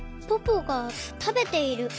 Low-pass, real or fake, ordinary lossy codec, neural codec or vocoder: none; real; none; none